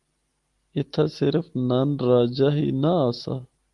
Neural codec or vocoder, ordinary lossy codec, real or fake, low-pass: none; Opus, 24 kbps; real; 10.8 kHz